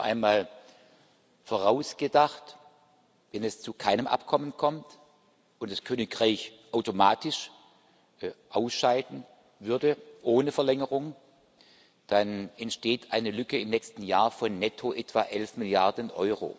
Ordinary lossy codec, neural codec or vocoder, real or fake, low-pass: none; none; real; none